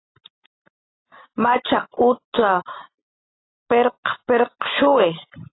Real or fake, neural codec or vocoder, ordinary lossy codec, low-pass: real; none; AAC, 16 kbps; 7.2 kHz